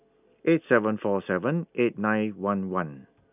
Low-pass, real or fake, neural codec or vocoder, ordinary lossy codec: 3.6 kHz; real; none; none